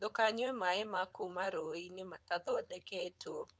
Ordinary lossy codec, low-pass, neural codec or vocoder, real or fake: none; none; codec, 16 kHz, 4.8 kbps, FACodec; fake